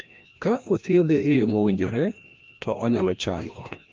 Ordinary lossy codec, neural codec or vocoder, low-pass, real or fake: Opus, 24 kbps; codec, 16 kHz, 1 kbps, FreqCodec, larger model; 7.2 kHz; fake